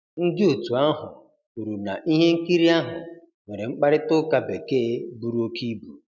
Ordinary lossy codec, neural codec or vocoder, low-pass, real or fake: none; none; 7.2 kHz; real